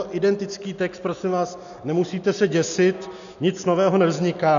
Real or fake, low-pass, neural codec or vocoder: real; 7.2 kHz; none